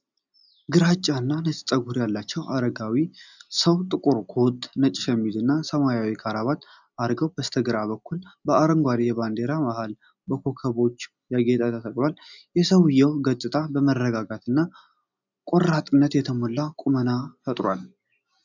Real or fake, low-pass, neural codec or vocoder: real; 7.2 kHz; none